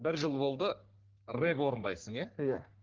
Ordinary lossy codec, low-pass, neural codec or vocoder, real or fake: Opus, 16 kbps; 7.2 kHz; codec, 44.1 kHz, 3.4 kbps, Pupu-Codec; fake